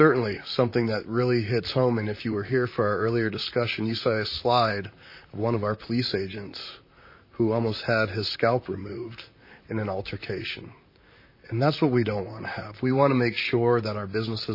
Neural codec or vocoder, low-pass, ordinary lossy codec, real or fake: vocoder, 44.1 kHz, 128 mel bands, Pupu-Vocoder; 5.4 kHz; MP3, 24 kbps; fake